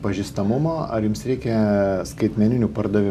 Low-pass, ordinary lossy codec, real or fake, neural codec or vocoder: 14.4 kHz; MP3, 96 kbps; real; none